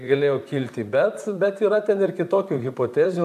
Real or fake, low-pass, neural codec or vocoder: fake; 14.4 kHz; vocoder, 44.1 kHz, 128 mel bands, Pupu-Vocoder